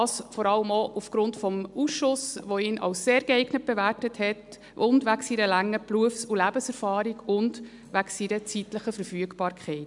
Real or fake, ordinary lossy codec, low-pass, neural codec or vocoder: real; MP3, 96 kbps; 10.8 kHz; none